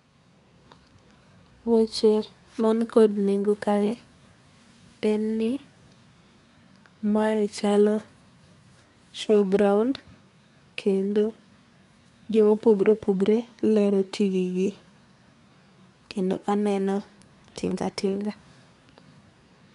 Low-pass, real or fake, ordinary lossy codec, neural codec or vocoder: 10.8 kHz; fake; none; codec, 24 kHz, 1 kbps, SNAC